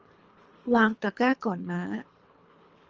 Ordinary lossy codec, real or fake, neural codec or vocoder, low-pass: Opus, 24 kbps; fake; codec, 24 kHz, 3 kbps, HILCodec; 7.2 kHz